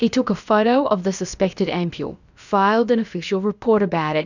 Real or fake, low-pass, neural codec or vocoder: fake; 7.2 kHz; codec, 16 kHz, about 1 kbps, DyCAST, with the encoder's durations